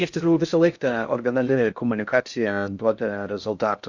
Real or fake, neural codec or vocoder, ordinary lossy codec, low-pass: fake; codec, 16 kHz in and 24 kHz out, 0.6 kbps, FocalCodec, streaming, 2048 codes; Opus, 64 kbps; 7.2 kHz